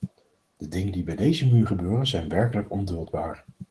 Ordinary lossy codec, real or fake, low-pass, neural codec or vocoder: Opus, 16 kbps; fake; 10.8 kHz; autoencoder, 48 kHz, 128 numbers a frame, DAC-VAE, trained on Japanese speech